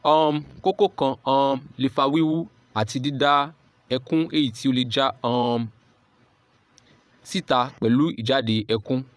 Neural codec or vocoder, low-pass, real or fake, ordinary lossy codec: vocoder, 22.05 kHz, 80 mel bands, Vocos; none; fake; none